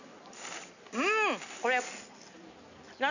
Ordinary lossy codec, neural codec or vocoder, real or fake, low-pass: none; none; real; 7.2 kHz